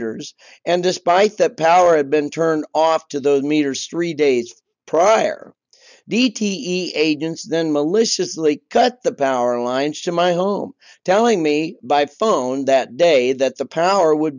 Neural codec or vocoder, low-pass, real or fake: none; 7.2 kHz; real